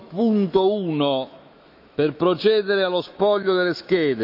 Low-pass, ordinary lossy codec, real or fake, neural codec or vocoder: 5.4 kHz; none; fake; autoencoder, 48 kHz, 128 numbers a frame, DAC-VAE, trained on Japanese speech